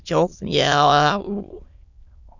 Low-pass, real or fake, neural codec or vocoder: 7.2 kHz; fake; autoencoder, 22.05 kHz, a latent of 192 numbers a frame, VITS, trained on many speakers